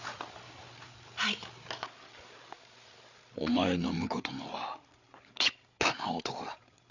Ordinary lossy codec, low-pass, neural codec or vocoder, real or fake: none; 7.2 kHz; codec, 16 kHz, 8 kbps, FreqCodec, larger model; fake